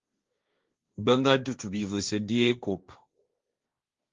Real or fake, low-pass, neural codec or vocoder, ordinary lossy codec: fake; 7.2 kHz; codec, 16 kHz, 1.1 kbps, Voila-Tokenizer; Opus, 32 kbps